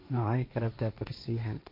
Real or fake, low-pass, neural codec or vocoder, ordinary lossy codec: fake; 5.4 kHz; codec, 16 kHz, 1.1 kbps, Voila-Tokenizer; MP3, 32 kbps